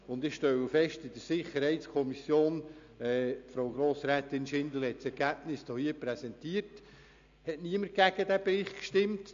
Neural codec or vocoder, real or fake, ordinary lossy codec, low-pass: none; real; AAC, 64 kbps; 7.2 kHz